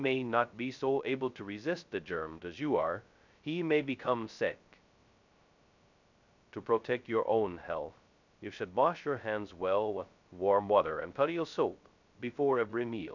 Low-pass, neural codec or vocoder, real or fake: 7.2 kHz; codec, 16 kHz, 0.2 kbps, FocalCodec; fake